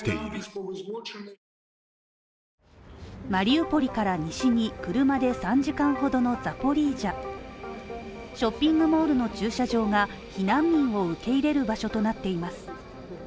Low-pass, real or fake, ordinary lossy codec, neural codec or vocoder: none; real; none; none